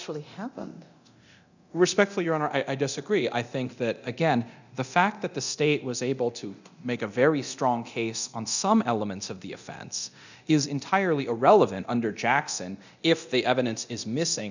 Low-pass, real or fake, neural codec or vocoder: 7.2 kHz; fake; codec, 24 kHz, 0.9 kbps, DualCodec